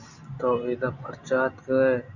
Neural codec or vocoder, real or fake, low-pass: none; real; 7.2 kHz